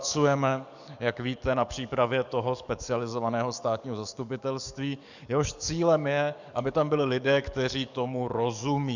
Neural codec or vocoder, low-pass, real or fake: codec, 44.1 kHz, 7.8 kbps, DAC; 7.2 kHz; fake